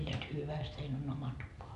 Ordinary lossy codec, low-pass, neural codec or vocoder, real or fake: none; 10.8 kHz; none; real